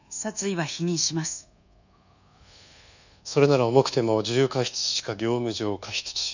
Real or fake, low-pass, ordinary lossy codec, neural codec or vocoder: fake; 7.2 kHz; none; codec, 24 kHz, 1.2 kbps, DualCodec